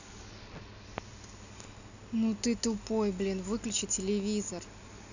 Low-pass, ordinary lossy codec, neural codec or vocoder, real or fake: 7.2 kHz; none; none; real